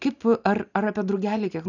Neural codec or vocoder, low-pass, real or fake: none; 7.2 kHz; real